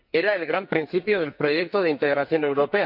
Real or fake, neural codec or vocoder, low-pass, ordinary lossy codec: fake; codec, 44.1 kHz, 2.6 kbps, SNAC; 5.4 kHz; none